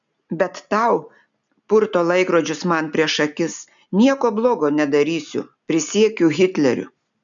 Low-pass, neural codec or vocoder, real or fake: 7.2 kHz; none; real